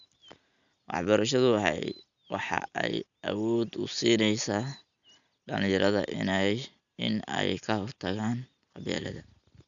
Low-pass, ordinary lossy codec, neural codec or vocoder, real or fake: 7.2 kHz; none; none; real